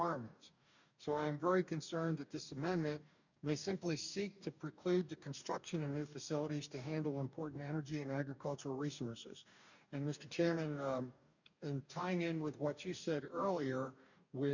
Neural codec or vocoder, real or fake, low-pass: codec, 44.1 kHz, 2.6 kbps, DAC; fake; 7.2 kHz